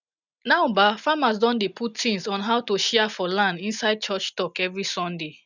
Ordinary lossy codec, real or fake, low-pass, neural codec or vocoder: none; real; 7.2 kHz; none